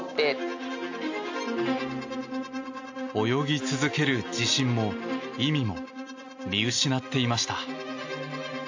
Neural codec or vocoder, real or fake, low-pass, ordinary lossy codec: none; real; 7.2 kHz; AAC, 48 kbps